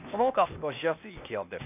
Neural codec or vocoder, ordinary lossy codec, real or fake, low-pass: codec, 16 kHz, 0.8 kbps, ZipCodec; none; fake; 3.6 kHz